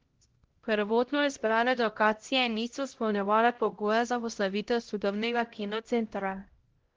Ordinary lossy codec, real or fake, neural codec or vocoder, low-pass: Opus, 16 kbps; fake; codec, 16 kHz, 0.5 kbps, X-Codec, HuBERT features, trained on LibriSpeech; 7.2 kHz